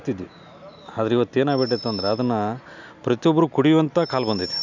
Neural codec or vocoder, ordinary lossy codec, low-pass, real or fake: none; none; 7.2 kHz; real